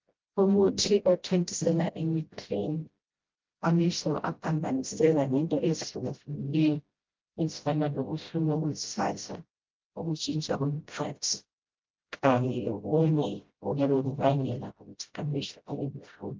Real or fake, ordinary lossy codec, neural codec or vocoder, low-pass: fake; Opus, 32 kbps; codec, 16 kHz, 0.5 kbps, FreqCodec, smaller model; 7.2 kHz